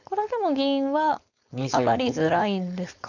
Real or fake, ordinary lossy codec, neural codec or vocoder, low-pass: fake; none; codec, 16 kHz, 4.8 kbps, FACodec; 7.2 kHz